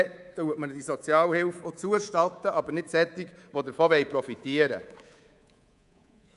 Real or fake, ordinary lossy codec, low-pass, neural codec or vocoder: fake; none; 10.8 kHz; codec, 24 kHz, 3.1 kbps, DualCodec